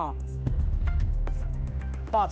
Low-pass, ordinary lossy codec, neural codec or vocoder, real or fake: none; none; codec, 16 kHz, 1 kbps, X-Codec, HuBERT features, trained on general audio; fake